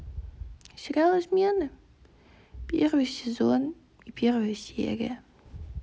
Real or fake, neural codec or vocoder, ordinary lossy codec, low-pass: real; none; none; none